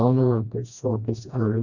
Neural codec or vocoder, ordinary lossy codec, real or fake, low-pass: codec, 16 kHz, 1 kbps, FreqCodec, smaller model; none; fake; 7.2 kHz